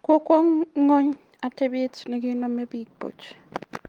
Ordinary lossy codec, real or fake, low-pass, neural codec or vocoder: Opus, 24 kbps; real; 19.8 kHz; none